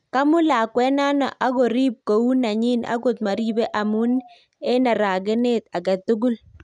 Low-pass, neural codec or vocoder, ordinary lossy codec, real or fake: 10.8 kHz; none; none; real